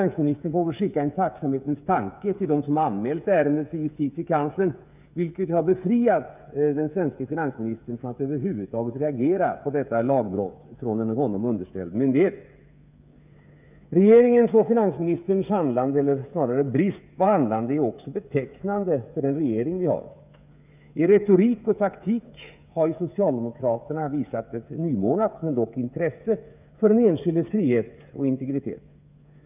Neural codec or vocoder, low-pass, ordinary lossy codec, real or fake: codec, 16 kHz, 8 kbps, FreqCodec, smaller model; 3.6 kHz; none; fake